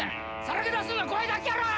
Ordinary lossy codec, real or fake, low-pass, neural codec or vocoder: none; real; none; none